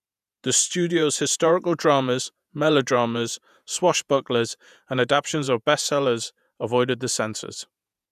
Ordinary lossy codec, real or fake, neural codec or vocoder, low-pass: none; fake; vocoder, 48 kHz, 128 mel bands, Vocos; 14.4 kHz